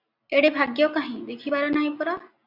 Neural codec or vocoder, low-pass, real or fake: none; 5.4 kHz; real